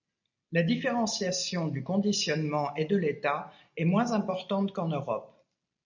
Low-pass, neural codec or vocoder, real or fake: 7.2 kHz; none; real